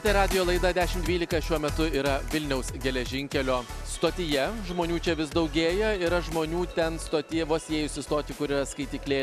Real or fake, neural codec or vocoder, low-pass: real; none; 14.4 kHz